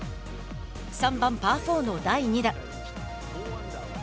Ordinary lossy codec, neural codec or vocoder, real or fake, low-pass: none; none; real; none